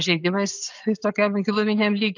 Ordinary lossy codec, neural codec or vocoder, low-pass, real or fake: AAC, 48 kbps; none; 7.2 kHz; real